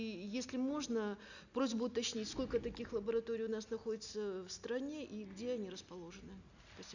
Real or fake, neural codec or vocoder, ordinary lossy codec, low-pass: real; none; none; 7.2 kHz